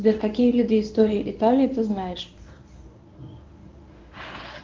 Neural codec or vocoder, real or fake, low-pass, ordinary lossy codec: codec, 24 kHz, 0.9 kbps, WavTokenizer, medium speech release version 1; fake; 7.2 kHz; Opus, 24 kbps